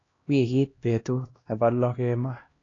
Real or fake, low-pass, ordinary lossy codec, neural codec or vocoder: fake; 7.2 kHz; AAC, 32 kbps; codec, 16 kHz, 1 kbps, X-Codec, HuBERT features, trained on LibriSpeech